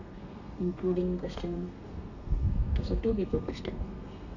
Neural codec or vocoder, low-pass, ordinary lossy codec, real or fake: codec, 32 kHz, 1.9 kbps, SNAC; 7.2 kHz; none; fake